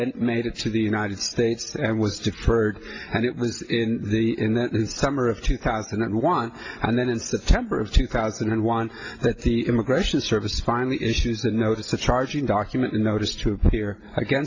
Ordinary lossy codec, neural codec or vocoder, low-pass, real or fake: AAC, 32 kbps; none; 7.2 kHz; real